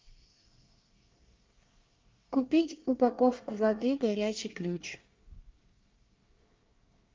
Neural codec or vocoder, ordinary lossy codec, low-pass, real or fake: codec, 24 kHz, 1 kbps, SNAC; Opus, 24 kbps; 7.2 kHz; fake